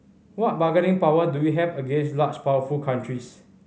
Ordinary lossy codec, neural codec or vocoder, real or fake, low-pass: none; none; real; none